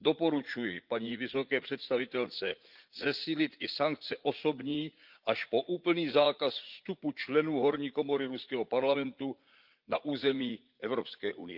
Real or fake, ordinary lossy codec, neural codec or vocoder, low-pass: fake; Opus, 24 kbps; vocoder, 44.1 kHz, 80 mel bands, Vocos; 5.4 kHz